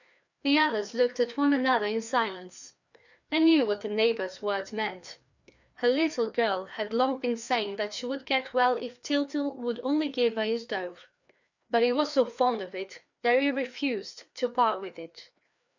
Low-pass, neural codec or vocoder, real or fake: 7.2 kHz; codec, 16 kHz, 2 kbps, FreqCodec, larger model; fake